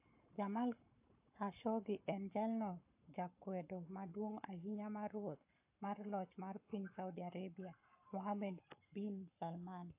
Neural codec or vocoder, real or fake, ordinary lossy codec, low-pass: codec, 44.1 kHz, 7.8 kbps, Pupu-Codec; fake; AAC, 32 kbps; 3.6 kHz